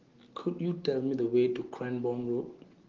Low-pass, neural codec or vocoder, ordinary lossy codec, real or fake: 7.2 kHz; none; Opus, 16 kbps; real